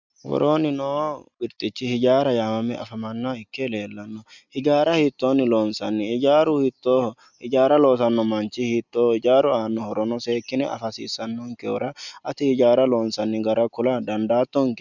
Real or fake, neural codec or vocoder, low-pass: real; none; 7.2 kHz